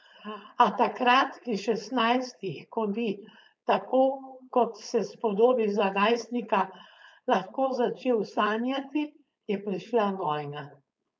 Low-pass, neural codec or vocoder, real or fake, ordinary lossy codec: none; codec, 16 kHz, 4.8 kbps, FACodec; fake; none